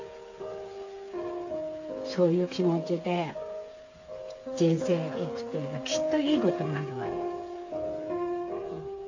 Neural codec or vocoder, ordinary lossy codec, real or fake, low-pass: codec, 16 kHz, 8 kbps, FreqCodec, smaller model; AAC, 48 kbps; fake; 7.2 kHz